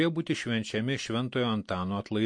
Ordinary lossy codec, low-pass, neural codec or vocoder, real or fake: MP3, 48 kbps; 9.9 kHz; none; real